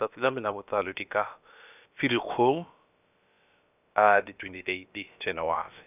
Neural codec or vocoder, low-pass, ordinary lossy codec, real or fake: codec, 16 kHz, about 1 kbps, DyCAST, with the encoder's durations; 3.6 kHz; none; fake